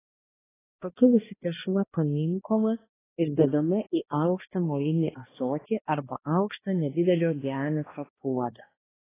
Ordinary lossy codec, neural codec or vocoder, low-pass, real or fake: AAC, 16 kbps; codec, 16 kHz, 1 kbps, X-Codec, HuBERT features, trained on balanced general audio; 3.6 kHz; fake